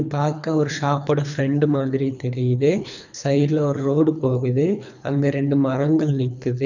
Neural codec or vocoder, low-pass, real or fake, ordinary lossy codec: codec, 24 kHz, 3 kbps, HILCodec; 7.2 kHz; fake; none